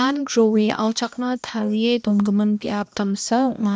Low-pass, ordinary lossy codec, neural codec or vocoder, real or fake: none; none; codec, 16 kHz, 1 kbps, X-Codec, HuBERT features, trained on balanced general audio; fake